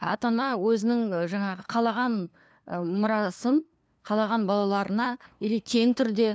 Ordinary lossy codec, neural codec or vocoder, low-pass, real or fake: none; codec, 16 kHz, 2 kbps, FunCodec, trained on LibriTTS, 25 frames a second; none; fake